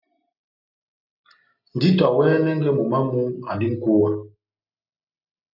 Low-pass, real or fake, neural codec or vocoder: 5.4 kHz; real; none